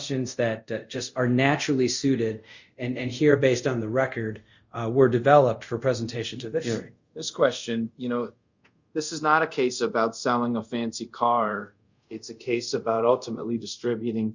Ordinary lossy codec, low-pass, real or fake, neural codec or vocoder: Opus, 64 kbps; 7.2 kHz; fake; codec, 24 kHz, 0.5 kbps, DualCodec